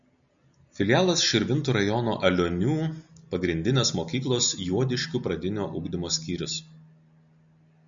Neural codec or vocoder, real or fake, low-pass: none; real; 7.2 kHz